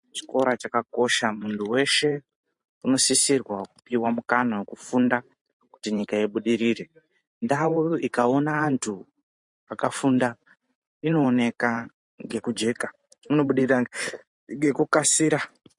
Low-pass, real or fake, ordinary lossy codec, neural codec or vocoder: 10.8 kHz; fake; MP3, 48 kbps; vocoder, 44.1 kHz, 128 mel bands every 512 samples, BigVGAN v2